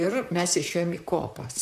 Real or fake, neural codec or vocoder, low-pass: fake; vocoder, 44.1 kHz, 128 mel bands, Pupu-Vocoder; 14.4 kHz